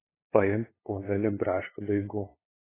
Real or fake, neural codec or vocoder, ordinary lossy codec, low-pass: fake; codec, 16 kHz, 2 kbps, FunCodec, trained on LibriTTS, 25 frames a second; AAC, 16 kbps; 3.6 kHz